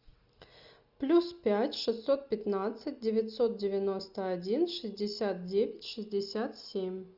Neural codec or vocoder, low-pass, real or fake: none; 5.4 kHz; real